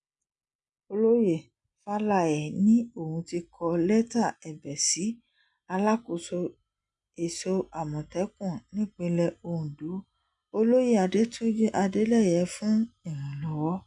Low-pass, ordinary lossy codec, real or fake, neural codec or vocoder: 10.8 kHz; MP3, 96 kbps; real; none